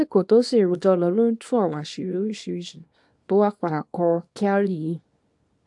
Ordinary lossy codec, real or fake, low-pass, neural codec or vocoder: AAC, 64 kbps; fake; 10.8 kHz; codec, 24 kHz, 0.9 kbps, WavTokenizer, small release